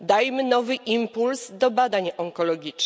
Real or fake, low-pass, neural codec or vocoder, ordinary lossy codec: real; none; none; none